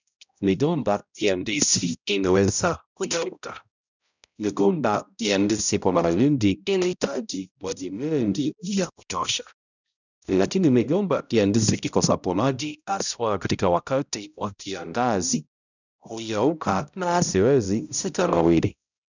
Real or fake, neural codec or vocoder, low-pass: fake; codec, 16 kHz, 0.5 kbps, X-Codec, HuBERT features, trained on balanced general audio; 7.2 kHz